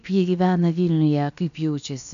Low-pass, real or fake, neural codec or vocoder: 7.2 kHz; fake; codec, 16 kHz, about 1 kbps, DyCAST, with the encoder's durations